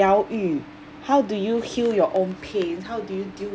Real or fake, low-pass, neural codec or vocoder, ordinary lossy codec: real; none; none; none